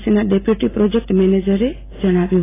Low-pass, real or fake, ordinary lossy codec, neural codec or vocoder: 3.6 kHz; real; AAC, 16 kbps; none